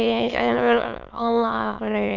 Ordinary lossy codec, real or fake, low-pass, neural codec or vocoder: none; fake; 7.2 kHz; autoencoder, 22.05 kHz, a latent of 192 numbers a frame, VITS, trained on many speakers